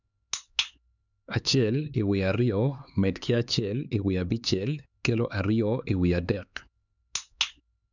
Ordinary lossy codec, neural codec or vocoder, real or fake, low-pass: none; codec, 16 kHz, 4 kbps, X-Codec, HuBERT features, trained on LibriSpeech; fake; 7.2 kHz